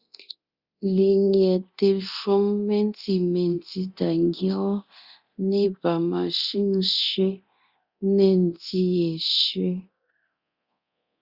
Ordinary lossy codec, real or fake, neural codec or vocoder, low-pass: Opus, 64 kbps; fake; codec, 24 kHz, 0.9 kbps, DualCodec; 5.4 kHz